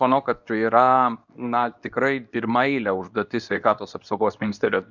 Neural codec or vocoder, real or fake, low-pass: codec, 24 kHz, 0.9 kbps, WavTokenizer, medium speech release version 2; fake; 7.2 kHz